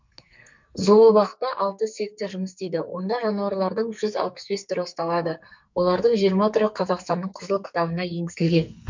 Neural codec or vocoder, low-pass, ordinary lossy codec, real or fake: codec, 44.1 kHz, 2.6 kbps, SNAC; 7.2 kHz; MP3, 64 kbps; fake